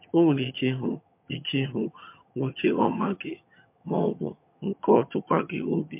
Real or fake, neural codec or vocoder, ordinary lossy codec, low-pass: fake; vocoder, 22.05 kHz, 80 mel bands, HiFi-GAN; MP3, 32 kbps; 3.6 kHz